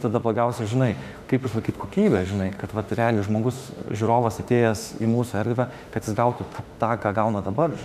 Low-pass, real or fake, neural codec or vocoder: 14.4 kHz; fake; autoencoder, 48 kHz, 32 numbers a frame, DAC-VAE, trained on Japanese speech